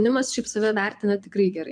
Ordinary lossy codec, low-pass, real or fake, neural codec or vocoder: MP3, 96 kbps; 9.9 kHz; fake; vocoder, 22.05 kHz, 80 mel bands, Vocos